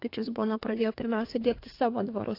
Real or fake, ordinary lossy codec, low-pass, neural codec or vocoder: fake; AAC, 32 kbps; 5.4 kHz; codec, 32 kHz, 1.9 kbps, SNAC